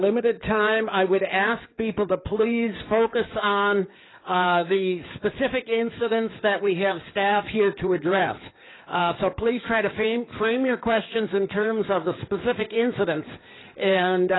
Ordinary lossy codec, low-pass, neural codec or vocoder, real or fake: AAC, 16 kbps; 7.2 kHz; codec, 16 kHz in and 24 kHz out, 2.2 kbps, FireRedTTS-2 codec; fake